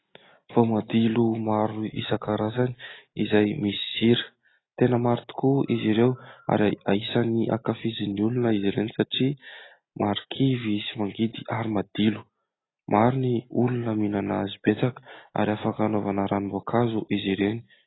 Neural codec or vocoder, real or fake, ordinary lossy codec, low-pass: none; real; AAC, 16 kbps; 7.2 kHz